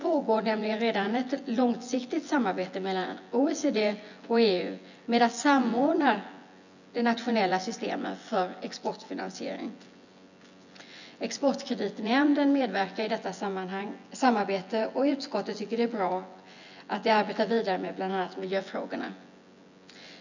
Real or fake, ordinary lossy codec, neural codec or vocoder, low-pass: fake; none; vocoder, 24 kHz, 100 mel bands, Vocos; 7.2 kHz